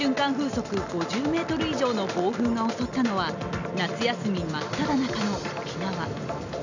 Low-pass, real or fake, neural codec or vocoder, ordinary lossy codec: 7.2 kHz; real; none; none